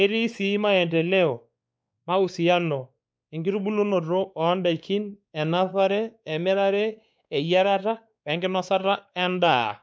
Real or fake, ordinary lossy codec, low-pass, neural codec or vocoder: fake; none; none; codec, 16 kHz, 4 kbps, X-Codec, WavLM features, trained on Multilingual LibriSpeech